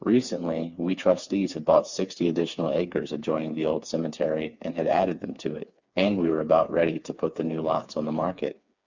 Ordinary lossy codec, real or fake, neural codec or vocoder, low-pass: Opus, 64 kbps; fake; codec, 16 kHz, 4 kbps, FreqCodec, smaller model; 7.2 kHz